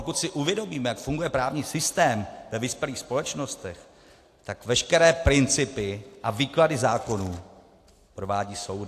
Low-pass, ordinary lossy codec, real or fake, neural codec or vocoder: 14.4 kHz; AAC, 64 kbps; real; none